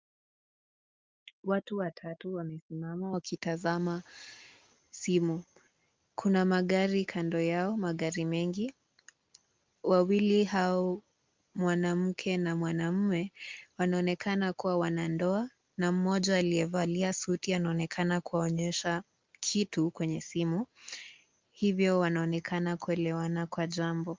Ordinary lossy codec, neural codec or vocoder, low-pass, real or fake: Opus, 32 kbps; none; 7.2 kHz; real